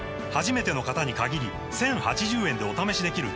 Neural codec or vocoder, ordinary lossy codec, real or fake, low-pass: none; none; real; none